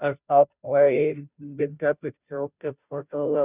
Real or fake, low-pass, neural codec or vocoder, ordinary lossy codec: fake; 3.6 kHz; codec, 16 kHz, 0.5 kbps, FunCodec, trained on Chinese and English, 25 frames a second; none